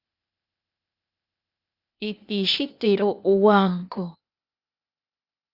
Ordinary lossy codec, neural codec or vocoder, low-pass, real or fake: Opus, 64 kbps; codec, 16 kHz, 0.8 kbps, ZipCodec; 5.4 kHz; fake